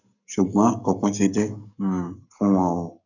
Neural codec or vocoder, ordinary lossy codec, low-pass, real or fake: codec, 44.1 kHz, 7.8 kbps, Pupu-Codec; none; 7.2 kHz; fake